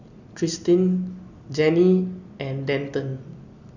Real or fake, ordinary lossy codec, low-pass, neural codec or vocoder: real; none; 7.2 kHz; none